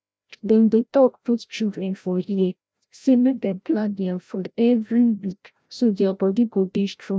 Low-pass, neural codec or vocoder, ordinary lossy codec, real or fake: none; codec, 16 kHz, 0.5 kbps, FreqCodec, larger model; none; fake